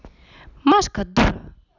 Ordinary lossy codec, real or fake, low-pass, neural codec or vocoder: none; real; 7.2 kHz; none